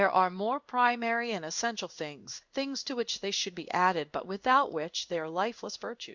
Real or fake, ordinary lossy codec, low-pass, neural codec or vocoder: fake; Opus, 64 kbps; 7.2 kHz; codec, 16 kHz in and 24 kHz out, 1 kbps, XY-Tokenizer